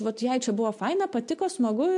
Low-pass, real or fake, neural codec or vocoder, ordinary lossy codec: 10.8 kHz; real; none; MP3, 64 kbps